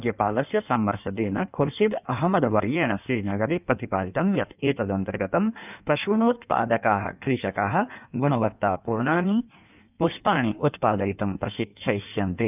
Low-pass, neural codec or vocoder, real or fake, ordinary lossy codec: 3.6 kHz; codec, 16 kHz in and 24 kHz out, 1.1 kbps, FireRedTTS-2 codec; fake; none